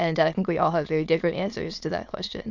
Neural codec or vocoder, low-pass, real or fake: autoencoder, 22.05 kHz, a latent of 192 numbers a frame, VITS, trained on many speakers; 7.2 kHz; fake